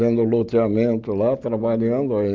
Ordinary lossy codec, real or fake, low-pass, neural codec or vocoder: Opus, 32 kbps; real; 7.2 kHz; none